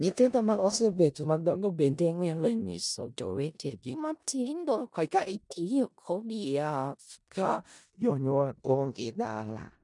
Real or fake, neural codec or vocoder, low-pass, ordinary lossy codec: fake; codec, 16 kHz in and 24 kHz out, 0.4 kbps, LongCat-Audio-Codec, four codebook decoder; 10.8 kHz; MP3, 96 kbps